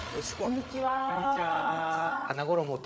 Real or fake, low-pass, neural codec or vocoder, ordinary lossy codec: fake; none; codec, 16 kHz, 8 kbps, FreqCodec, larger model; none